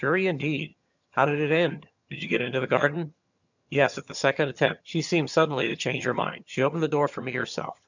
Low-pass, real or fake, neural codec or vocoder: 7.2 kHz; fake; vocoder, 22.05 kHz, 80 mel bands, HiFi-GAN